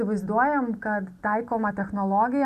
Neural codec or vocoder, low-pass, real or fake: none; 14.4 kHz; real